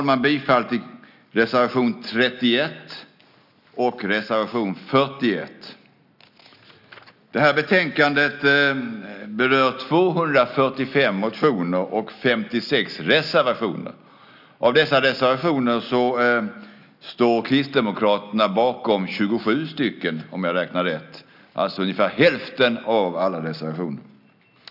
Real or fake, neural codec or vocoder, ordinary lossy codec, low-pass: real; none; none; 5.4 kHz